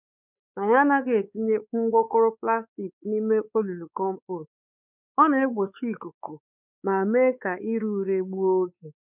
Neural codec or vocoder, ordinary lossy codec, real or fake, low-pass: codec, 16 kHz, 4 kbps, X-Codec, WavLM features, trained on Multilingual LibriSpeech; none; fake; 3.6 kHz